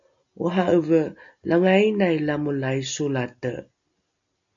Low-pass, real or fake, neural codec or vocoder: 7.2 kHz; real; none